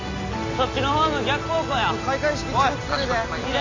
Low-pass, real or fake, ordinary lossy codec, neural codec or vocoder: 7.2 kHz; real; AAC, 32 kbps; none